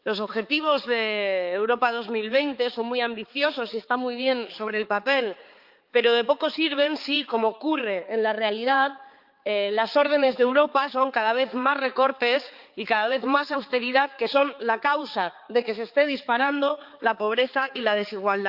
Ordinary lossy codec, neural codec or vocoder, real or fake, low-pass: Opus, 24 kbps; codec, 16 kHz, 4 kbps, X-Codec, HuBERT features, trained on balanced general audio; fake; 5.4 kHz